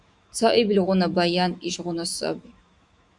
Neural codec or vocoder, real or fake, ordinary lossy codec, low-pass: autoencoder, 48 kHz, 128 numbers a frame, DAC-VAE, trained on Japanese speech; fake; Opus, 64 kbps; 10.8 kHz